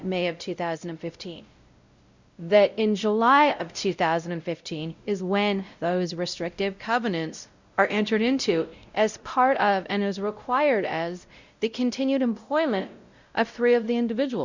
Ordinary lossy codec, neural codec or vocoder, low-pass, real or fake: Opus, 64 kbps; codec, 16 kHz, 0.5 kbps, X-Codec, WavLM features, trained on Multilingual LibriSpeech; 7.2 kHz; fake